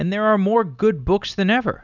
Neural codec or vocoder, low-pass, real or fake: none; 7.2 kHz; real